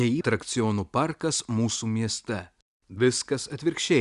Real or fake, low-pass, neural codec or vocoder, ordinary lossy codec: real; 10.8 kHz; none; Opus, 64 kbps